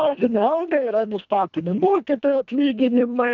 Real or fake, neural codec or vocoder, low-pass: fake; codec, 24 kHz, 1.5 kbps, HILCodec; 7.2 kHz